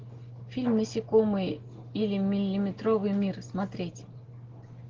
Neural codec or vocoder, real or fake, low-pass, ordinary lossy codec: none; real; 7.2 kHz; Opus, 16 kbps